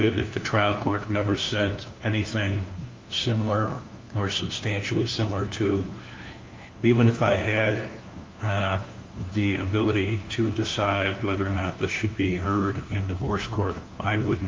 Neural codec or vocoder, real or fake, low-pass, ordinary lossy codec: codec, 16 kHz, 1 kbps, FunCodec, trained on LibriTTS, 50 frames a second; fake; 7.2 kHz; Opus, 32 kbps